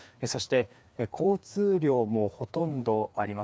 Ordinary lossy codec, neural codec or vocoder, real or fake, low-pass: none; codec, 16 kHz, 2 kbps, FreqCodec, larger model; fake; none